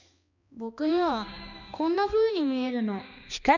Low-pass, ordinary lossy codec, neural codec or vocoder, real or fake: 7.2 kHz; none; codec, 16 kHz, 2 kbps, X-Codec, HuBERT features, trained on balanced general audio; fake